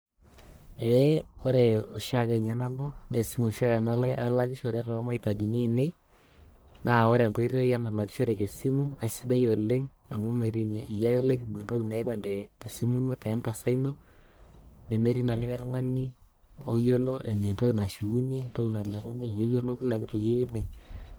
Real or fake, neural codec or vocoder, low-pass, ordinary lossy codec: fake; codec, 44.1 kHz, 1.7 kbps, Pupu-Codec; none; none